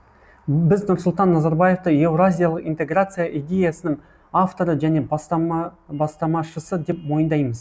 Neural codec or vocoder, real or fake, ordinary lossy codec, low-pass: none; real; none; none